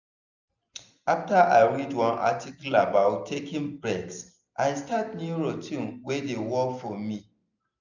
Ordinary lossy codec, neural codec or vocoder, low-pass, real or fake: none; none; 7.2 kHz; real